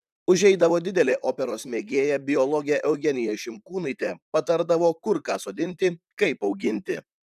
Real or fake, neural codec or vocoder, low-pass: fake; vocoder, 44.1 kHz, 128 mel bands, Pupu-Vocoder; 14.4 kHz